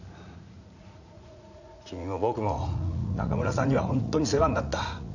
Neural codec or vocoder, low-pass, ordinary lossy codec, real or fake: vocoder, 44.1 kHz, 80 mel bands, Vocos; 7.2 kHz; none; fake